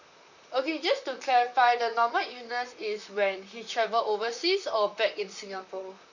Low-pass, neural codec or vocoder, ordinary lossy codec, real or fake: 7.2 kHz; vocoder, 44.1 kHz, 128 mel bands, Pupu-Vocoder; none; fake